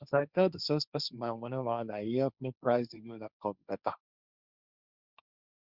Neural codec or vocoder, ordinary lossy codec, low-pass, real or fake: codec, 16 kHz, 1.1 kbps, Voila-Tokenizer; Opus, 64 kbps; 5.4 kHz; fake